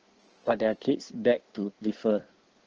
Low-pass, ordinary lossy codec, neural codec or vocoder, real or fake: 7.2 kHz; Opus, 16 kbps; codec, 16 kHz in and 24 kHz out, 1.1 kbps, FireRedTTS-2 codec; fake